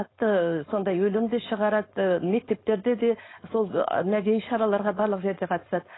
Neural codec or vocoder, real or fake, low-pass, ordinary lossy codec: codec, 16 kHz, 4.8 kbps, FACodec; fake; 7.2 kHz; AAC, 16 kbps